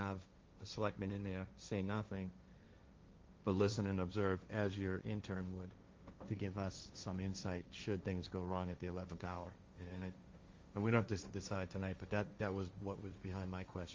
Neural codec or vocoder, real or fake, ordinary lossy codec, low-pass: codec, 16 kHz, 1.1 kbps, Voila-Tokenizer; fake; Opus, 32 kbps; 7.2 kHz